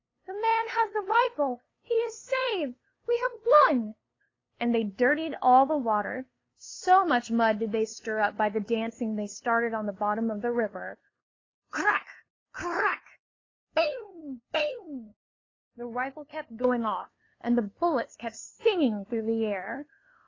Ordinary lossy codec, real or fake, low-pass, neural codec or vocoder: AAC, 32 kbps; fake; 7.2 kHz; codec, 16 kHz, 2 kbps, FunCodec, trained on LibriTTS, 25 frames a second